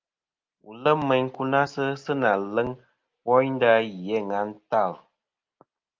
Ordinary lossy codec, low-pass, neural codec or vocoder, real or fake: Opus, 24 kbps; 7.2 kHz; none; real